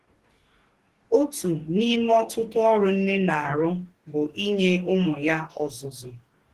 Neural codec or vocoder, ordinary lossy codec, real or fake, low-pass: codec, 44.1 kHz, 2.6 kbps, DAC; Opus, 16 kbps; fake; 14.4 kHz